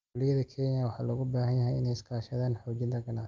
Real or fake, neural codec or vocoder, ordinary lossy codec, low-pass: real; none; Opus, 24 kbps; 7.2 kHz